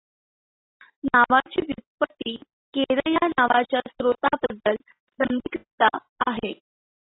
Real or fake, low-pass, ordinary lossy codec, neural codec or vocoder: real; 7.2 kHz; AAC, 16 kbps; none